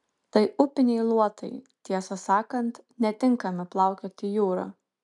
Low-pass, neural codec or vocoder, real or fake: 10.8 kHz; none; real